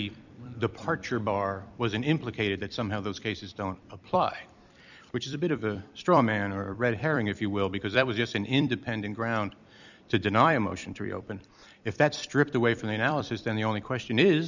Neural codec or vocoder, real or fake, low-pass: vocoder, 44.1 kHz, 128 mel bands every 256 samples, BigVGAN v2; fake; 7.2 kHz